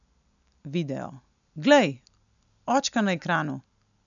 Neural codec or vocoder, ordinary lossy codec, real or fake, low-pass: none; none; real; 7.2 kHz